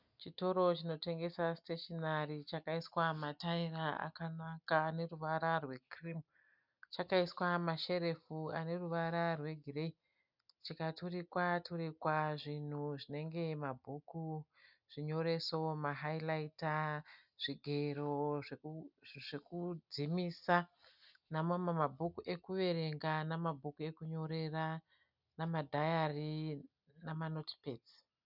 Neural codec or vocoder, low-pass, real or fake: none; 5.4 kHz; real